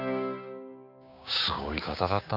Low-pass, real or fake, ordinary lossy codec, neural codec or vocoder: 5.4 kHz; fake; none; codec, 16 kHz, 6 kbps, DAC